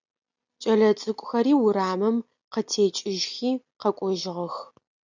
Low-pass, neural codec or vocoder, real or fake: 7.2 kHz; none; real